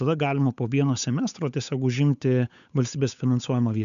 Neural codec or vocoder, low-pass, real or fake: codec, 16 kHz, 8 kbps, FunCodec, trained on Chinese and English, 25 frames a second; 7.2 kHz; fake